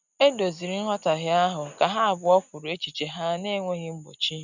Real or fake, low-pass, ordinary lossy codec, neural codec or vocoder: real; 7.2 kHz; none; none